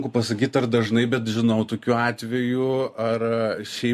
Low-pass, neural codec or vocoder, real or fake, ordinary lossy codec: 14.4 kHz; none; real; MP3, 64 kbps